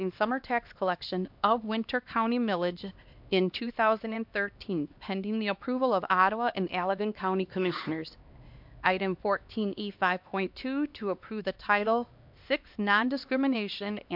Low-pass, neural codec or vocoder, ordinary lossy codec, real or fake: 5.4 kHz; codec, 16 kHz, 2 kbps, X-Codec, HuBERT features, trained on LibriSpeech; MP3, 48 kbps; fake